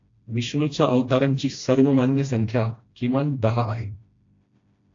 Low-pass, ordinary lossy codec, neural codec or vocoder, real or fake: 7.2 kHz; AAC, 48 kbps; codec, 16 kHz, 1 kbps, FreqCodec, smaller model; fake